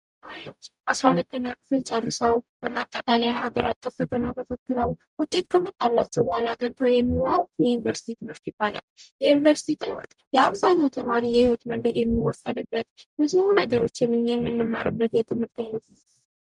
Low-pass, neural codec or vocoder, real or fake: 10.8 kHz; codec, 44.1 kHz, 0.9 kbps, DAC; fake